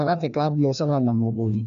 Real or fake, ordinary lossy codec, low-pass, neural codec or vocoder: fake; none; 7.2 kHz; codec, 16 kHz, 1 kbps, FreqCodec, larger model